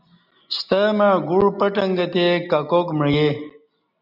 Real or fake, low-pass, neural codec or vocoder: real; 5.4 kHz; none